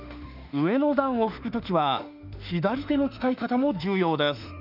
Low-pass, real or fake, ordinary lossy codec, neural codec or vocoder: 5.4 kHz; fake; none; autoencoder, 48 kHz, 32 numbers a frame, DAC-VAE, trained on Japanese speech